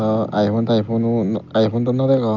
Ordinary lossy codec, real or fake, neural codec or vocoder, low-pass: Opus, 24 kbps; real; none; 7.2 kHz